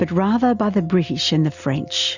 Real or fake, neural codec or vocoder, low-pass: real; none; 7.2 kHz